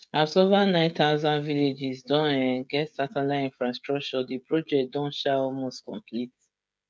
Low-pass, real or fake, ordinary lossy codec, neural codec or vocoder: none; fake; none; codec, 16 kHz, 16 kbps, FreqCodec, smaller model